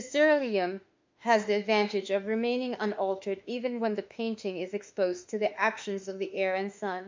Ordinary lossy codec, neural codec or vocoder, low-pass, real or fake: MP3, 64 kbps; autoencoder, 48 kHz, 32 numbers a frame, DAC-VAE, trained on Japanese speech; 7.2 kHz; fake